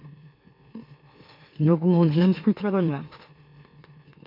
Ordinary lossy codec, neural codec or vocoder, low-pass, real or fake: MP3, 32 kbps; autoencoder, 44.1 kHz, a latent of 192 numbers a frame, MeloTTS; 5.4 kHz; fake